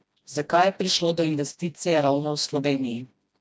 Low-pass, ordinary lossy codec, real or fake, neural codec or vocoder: none; none; fake; codec, 16 kHz, 1 kbps, FreqCodec, smaller model